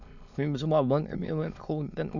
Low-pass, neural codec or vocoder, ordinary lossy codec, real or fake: 7.2 kHz; autoencoder, 22.05 kHz, a latent of 192 numbers a frame, VITS, trained on many speakers; none; fake